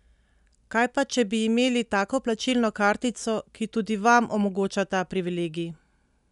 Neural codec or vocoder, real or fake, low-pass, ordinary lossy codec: none; real; 10.8 kHz; none